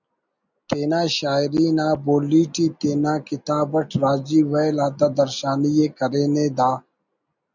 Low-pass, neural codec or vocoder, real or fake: 7.2 kHz; none; real